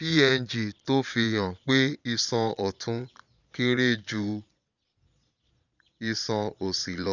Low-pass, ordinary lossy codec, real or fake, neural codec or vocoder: 7.2 kHz; none; fake; vocoder, 22.05 kHz, 80 mel bands, Vocos